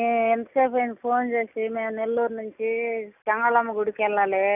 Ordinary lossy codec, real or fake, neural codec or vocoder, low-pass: none; real; none; 3.6 kHz